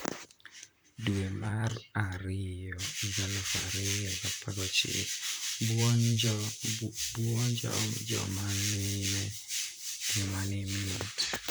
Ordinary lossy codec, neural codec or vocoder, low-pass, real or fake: none; vocoder, 44.1 kHz, 128 mel bands, Pupu-Vocoder; none; fake